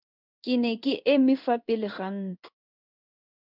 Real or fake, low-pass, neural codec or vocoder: fake; 5.4 kHz; codec, 16 kHz in and 24 kHz out, 1 kbps, XY-Tokenizer